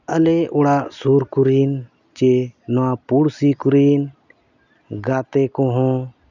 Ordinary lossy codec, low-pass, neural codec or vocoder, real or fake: none; 7.2 kHz; none; real